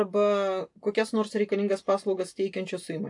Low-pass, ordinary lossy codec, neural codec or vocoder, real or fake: 10.8 kHz; AAC, 64 kbps; none; real